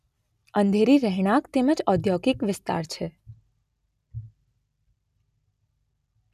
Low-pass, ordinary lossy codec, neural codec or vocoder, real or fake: 14.4 kHz; none; none; real